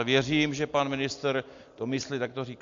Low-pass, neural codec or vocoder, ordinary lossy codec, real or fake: 7.2 kHz; none; AAC, 64 kbps; real